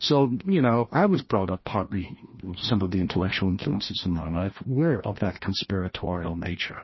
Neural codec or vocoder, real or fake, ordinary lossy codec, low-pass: codec, 16 kHz, 1 kbps, FreqCodec, larger model; fake; MP3, 24 kbps; 7.2 kHz